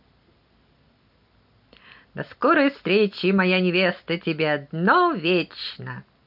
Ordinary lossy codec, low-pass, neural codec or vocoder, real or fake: none; 5.4 kHz; none; real